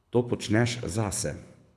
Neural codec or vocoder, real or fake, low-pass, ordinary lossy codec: codec, 24 kHz, 6 kbps, HILCodec; fake; none; none